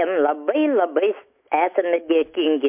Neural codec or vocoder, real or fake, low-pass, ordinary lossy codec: autoencoder, 48 kHz, 128 numbers a frame, DAC-VAE, trained on Japanese speech; fake; 3.6 kHz; MP3, 32 kbps